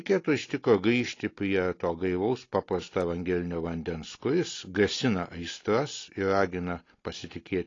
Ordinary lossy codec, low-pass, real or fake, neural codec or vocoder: AAC, 32 kbps; 7.2 kHz; real; none